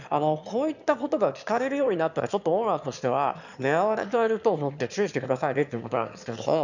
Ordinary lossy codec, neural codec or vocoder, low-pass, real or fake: none; autoencoder, 22.05 kHz, a latent of 192 numbers a frame, VITS, trained on one speaker; 7.2 kHz; fake